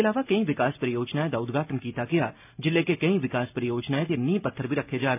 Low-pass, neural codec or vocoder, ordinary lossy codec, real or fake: 3.6 kHz; none; none; real